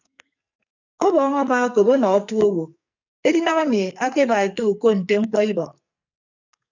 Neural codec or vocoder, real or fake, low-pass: codec, 44.1 kHz, 2.6 kbps, SNAC; fake; 7.2 kHz